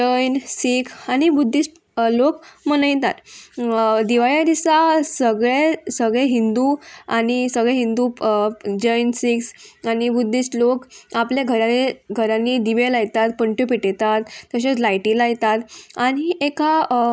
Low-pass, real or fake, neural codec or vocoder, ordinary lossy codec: none; real; none; none